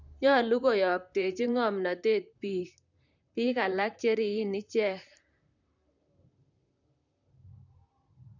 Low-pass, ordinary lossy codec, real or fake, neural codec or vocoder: 7.2 kHz; none; fake; vocoder, 44.1 kHz, 128 mel bands, Pupu-Vocoder